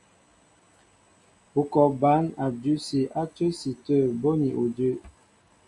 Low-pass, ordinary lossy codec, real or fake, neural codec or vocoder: 10.8 kHz; AAC, 64 kbps; real; none